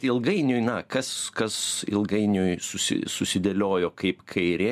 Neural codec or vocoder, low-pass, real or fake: none; 14.4 kHz; real